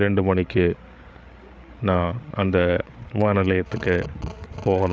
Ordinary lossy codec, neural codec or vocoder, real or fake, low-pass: none; codec, 16 kHz, 8 kbps, FreqCodec, larger model; fake; none